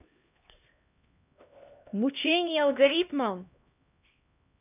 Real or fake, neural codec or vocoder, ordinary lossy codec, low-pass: fake; codec, 16 kHz, 1 kbps, X-Codec, HuBERT features, trained on LibriSpeech; none; 3.6 kHz